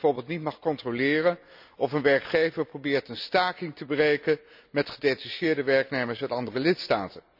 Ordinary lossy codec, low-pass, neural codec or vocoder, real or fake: none; 5.4 kHz; none; real